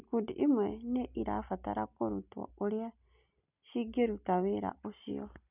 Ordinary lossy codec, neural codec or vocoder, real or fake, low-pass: none; none; real; 3.6 kHz